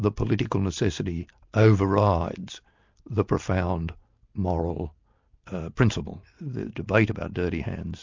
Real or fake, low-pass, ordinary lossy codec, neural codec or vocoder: real; 7.2 kHz; MP3, 64 kbps; none